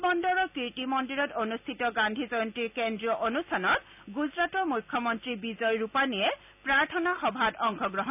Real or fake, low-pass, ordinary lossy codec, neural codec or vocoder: real; 3.6 kHz; none; none